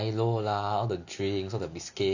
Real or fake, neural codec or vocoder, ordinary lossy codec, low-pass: real; none; none; 7.2 kHz